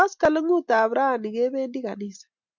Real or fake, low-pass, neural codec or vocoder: real; 7.2 kHz; none